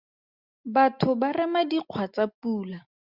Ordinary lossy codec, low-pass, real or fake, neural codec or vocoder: Opus, 64 kbps; 5.4 kHz; real; none